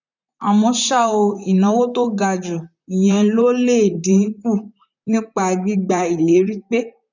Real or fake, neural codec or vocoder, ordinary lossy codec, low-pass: fake; vocoder, 44.1 kHz, 128 mel bands, Pupu-Vocoder; none; 7.2 kHz